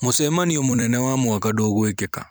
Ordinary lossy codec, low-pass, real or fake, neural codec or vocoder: none; none; fake; vocoder, 44.1 kHz, 128 mel bands every 256 samples, BigVGAN v2